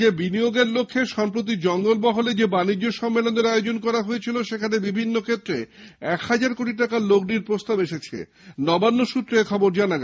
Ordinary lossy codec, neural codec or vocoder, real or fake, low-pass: none; none; real; none